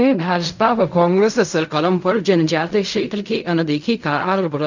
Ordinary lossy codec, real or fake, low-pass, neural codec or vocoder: none; fake; 7.2 kHz; codec, 16 kHz in and 24 kHz out, 0.4 kbps, LongCat-Audio-Codec, fine tuned four codebook decoder